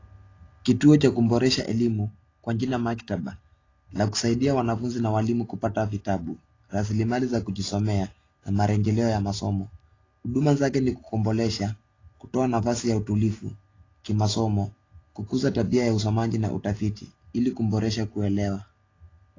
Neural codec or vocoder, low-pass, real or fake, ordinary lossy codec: none; 7.2 kHz; real; AAC, 32 kbps